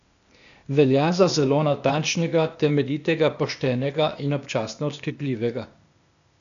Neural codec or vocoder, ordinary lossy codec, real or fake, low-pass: codec, 16 kHz, 0.8 kbps, ZipCodec; none; fake; 7.2 kHz